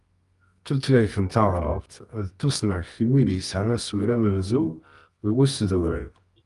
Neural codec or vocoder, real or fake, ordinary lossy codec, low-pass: codec, 24 kHz, 0.9 kbps, WavTokenizer, medium music audio release; fake; Opus, 32 kbps; 10.8 kHz